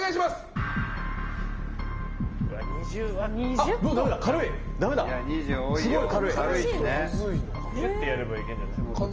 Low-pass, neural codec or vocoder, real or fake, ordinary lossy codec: 7.2 kHz; none; real; Opus, 24 kbps